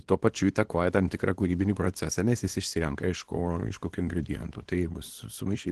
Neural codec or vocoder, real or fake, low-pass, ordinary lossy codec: codec, 24 kHz, 0.9 kbps, WavTokenizer, small release; fake; 10.8 kHz; Opus, 16 kbps